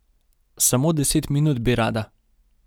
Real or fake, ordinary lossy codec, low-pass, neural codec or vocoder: real; none; none; none